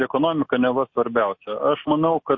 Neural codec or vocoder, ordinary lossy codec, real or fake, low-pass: none; MP3, 32 kbps; real; 7.2 kHz